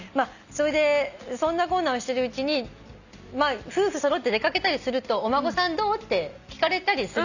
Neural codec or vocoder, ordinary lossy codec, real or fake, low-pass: none; none; real; 7.2 kHz